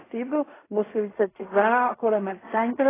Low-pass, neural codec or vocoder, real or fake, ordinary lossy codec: 3.6 kHz; codec, 16 kHz in and 24 kHz out, 0.4 kbps, LongCat-Audio-Codec, fine tuned four codebook decoder; fake; AAC, 16 kbps